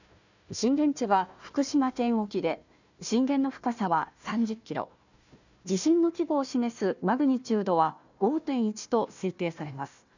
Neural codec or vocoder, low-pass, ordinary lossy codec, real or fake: codec, 16 kHz, 1 kbps, FunCodec, trained on Chinese and English, 50 frames a second; 7.2 kHz; none; fake